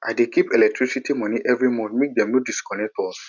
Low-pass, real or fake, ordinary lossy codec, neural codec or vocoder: 7.2 kHz; real; none; none